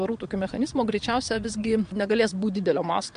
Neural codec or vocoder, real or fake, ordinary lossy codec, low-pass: vocoder, 22.05 kHz, 80 mel bands, Vocos; fake; MP3, 64 kbps; 9.9 kHz